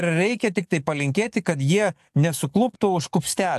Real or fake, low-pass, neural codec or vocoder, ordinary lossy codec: fake; 14.4 kHz; codec, 44.1 kHz, 7.8 kbps, DAC; AAC, 96 kbps